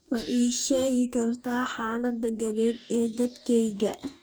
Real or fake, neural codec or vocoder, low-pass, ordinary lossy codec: fake; codec, 44.1 kHz, 2.6 kbps, DAC; none; none